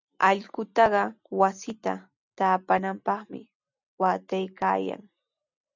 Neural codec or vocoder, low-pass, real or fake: none; 7.2 kHz; real